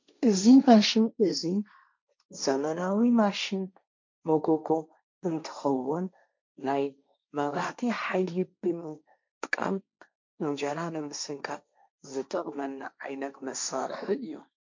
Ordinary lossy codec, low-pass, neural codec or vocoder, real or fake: MP3, 64 kbps; 7.2 kHz; codec, 16 kHz, 1.1 kbps, Voila-Tokenizer; fake